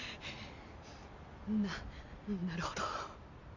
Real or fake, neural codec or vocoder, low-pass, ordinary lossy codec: real; none; 7.2 kHz; none